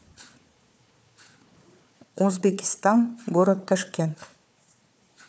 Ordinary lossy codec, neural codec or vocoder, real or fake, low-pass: none; codec, 16 kHz, 4 kbps, FunCodec, trained on Chinese and English, 50 frames a second; fake; none